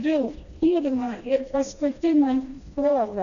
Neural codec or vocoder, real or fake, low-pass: codec, 16 kHz, 1 kbps, FreqCodec, smaller model; fake; 7.2 kHz